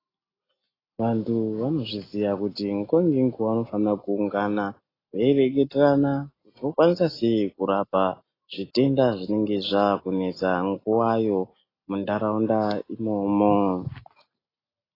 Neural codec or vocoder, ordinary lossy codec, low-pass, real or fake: none; AAC, 24 kbps; 5.4 kHz; real